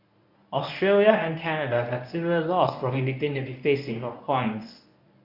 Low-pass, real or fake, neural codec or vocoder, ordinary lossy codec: 5.4 kHz; fake; codec, 24 kHz, 0.9 kbps, WavTokenizer, medium speech release version 1; none